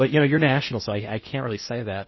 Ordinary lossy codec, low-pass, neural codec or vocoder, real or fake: MP3, 24 kbps; 7.2 kHz; codec, 16 kHz in and 24 kHz out, 0.8 kbps, FocalCodec, streaming, 65536 codes; fake